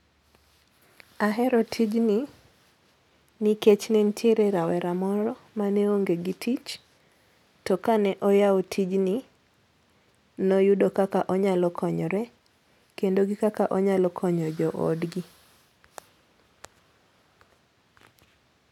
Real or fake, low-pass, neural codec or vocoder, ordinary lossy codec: real; 19.8 kHz; none; none